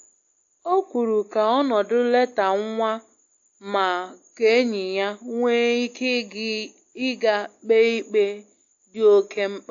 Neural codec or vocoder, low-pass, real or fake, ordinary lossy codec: none; 7.2 kHz; real; AAC, 48 kbps